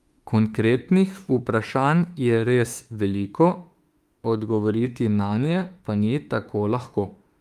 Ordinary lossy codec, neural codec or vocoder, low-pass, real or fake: Opus, 32 kbps; autoencoder, 48 kHz, 32 numbers a frame, DAC-VAE, trained on Japanese speech; 14.4 kHz; fake